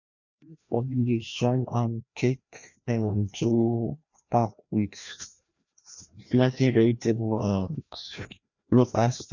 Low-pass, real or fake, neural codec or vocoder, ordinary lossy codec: 7.2 kHz; fake; codec, 16 kHz, 1 kbps, FreqCodec, larger model; none